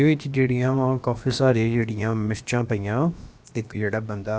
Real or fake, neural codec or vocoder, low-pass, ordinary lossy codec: fake; codec, 16 kHz, about 1 kbps, DyCAST, with the encoder's durations; none; none